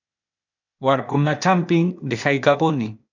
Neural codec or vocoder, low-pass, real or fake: codec, 16 kHz, 0.8 kbps, ZipCodec; 7.2 kHz; fake